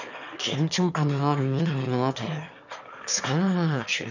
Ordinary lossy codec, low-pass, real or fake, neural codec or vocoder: none; 7.2 kHz; fake; autoencoder, 22.05 kHz, a latent of 192 numbers a frame, VITS, trained on one speaker